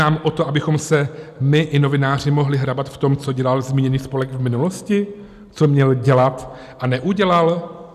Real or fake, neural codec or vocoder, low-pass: real; none; 14.4 kHz